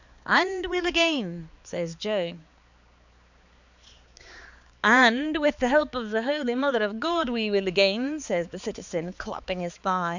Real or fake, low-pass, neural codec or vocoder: fake; 7.2 kHz; codec, 16 kHz, 4 kbps, X-Codec, HuBERT features, trained on balanced general audio